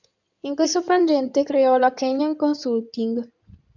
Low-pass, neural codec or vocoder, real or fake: 7.2 kHz; codec, 16 kHz in and 24 kHz out, 2.2 kbps, FireRedTTS-2 codec; fake